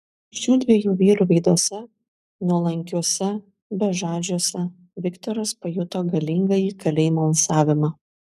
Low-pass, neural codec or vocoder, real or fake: 14.4 kHz; codec, 44.1 kHz, 7.8 kbps, Pupu-Codec; fake